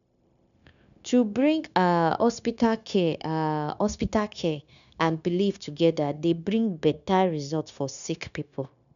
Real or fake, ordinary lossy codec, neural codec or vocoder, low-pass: fake; none; codec, 16 kHz, 0.9 kbps, LongCat-Audio-Codec; 7.2 kHz